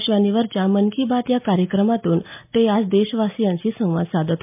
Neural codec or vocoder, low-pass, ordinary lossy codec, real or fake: none; 3.6 kHz; MP3, 32 kbps; real